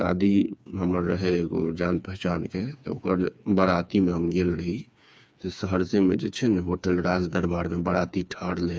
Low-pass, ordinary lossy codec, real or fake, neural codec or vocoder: none; none; fake; codec, 16 kHz, 4 kbps, FreqCodec, smaller model